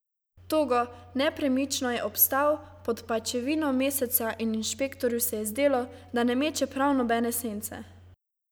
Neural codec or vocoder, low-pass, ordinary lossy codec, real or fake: none; none; none; real